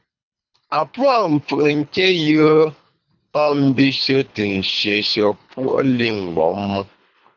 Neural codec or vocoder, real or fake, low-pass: codec, 24 kHz, 3 kbps, HILCodec; fake; 7.2 kHz